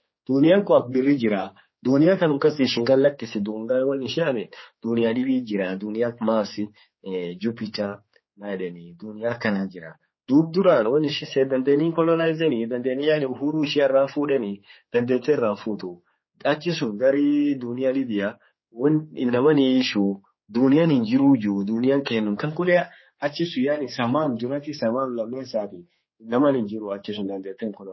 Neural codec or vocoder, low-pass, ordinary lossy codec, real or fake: codec, 16 kHz, 4 kbps, X-Codec, HuBERT features, trained on general audio; 7.2 kHz; MP3, 24 kbps; fake